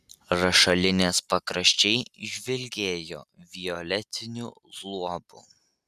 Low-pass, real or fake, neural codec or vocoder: 14.4 kHz; real; none